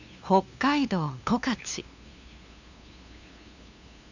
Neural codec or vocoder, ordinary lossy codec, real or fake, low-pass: codec, 16 kHz, 2 kbps, FunCodec, trained on LibriTTS, 25 frames a second; none; fake; 7.2 kHz